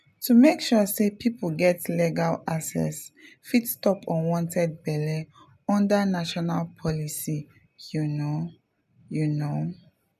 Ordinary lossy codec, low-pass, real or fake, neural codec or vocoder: none; 14.4 kHz; real; none